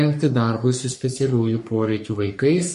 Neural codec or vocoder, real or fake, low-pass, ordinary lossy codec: codec, 44.1 kHz, 3.4 kbps, Pupu-Codec; fake; 14.4 kHz; MP3, 48 kbps